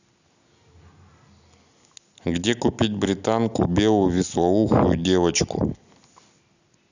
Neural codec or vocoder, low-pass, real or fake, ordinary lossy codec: none; 7.2 kHz; real; none